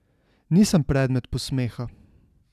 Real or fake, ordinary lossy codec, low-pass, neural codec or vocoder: real; none; 14.4 kHz; none